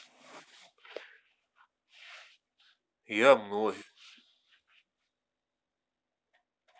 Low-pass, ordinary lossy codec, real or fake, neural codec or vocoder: none; none; real; none